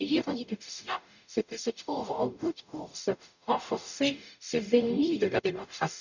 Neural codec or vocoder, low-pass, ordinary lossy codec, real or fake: codec, 44.1 kHz, 0.9 kbps, DAC; 7.2 kHz; none; fake